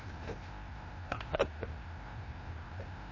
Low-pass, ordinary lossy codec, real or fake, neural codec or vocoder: 7.2 kHz; MP3, 32 kbps; fake; codec, 16 kHz, 1 kbps, FreqCodec, larger model